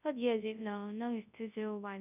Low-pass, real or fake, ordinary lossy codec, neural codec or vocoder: 3.6 kHz; fake; none; codec, 24 kHz, 0.9 kbps, WavTokenizer, large speech release